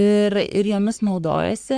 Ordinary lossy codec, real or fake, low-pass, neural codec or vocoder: Opus, 64 kbps; fake; 9.9 kHz; codec, 44.1 kHz, 3.4 kbps, Pupu-Codec